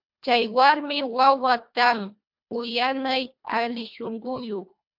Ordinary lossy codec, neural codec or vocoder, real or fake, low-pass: MP3, 48 kbps; codec, 24 kHz, 1.5 kbps, HILCodec; fake; 5.4 kHz